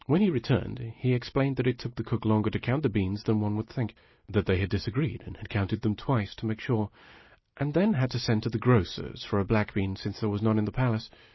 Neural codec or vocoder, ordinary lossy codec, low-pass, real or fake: none; MP3, 24 kbps; 7.2 kHz; real